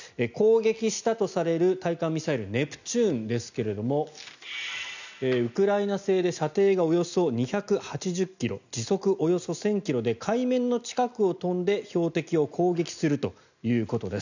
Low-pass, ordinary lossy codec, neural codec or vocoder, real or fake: 7.2 kHz; none; none; real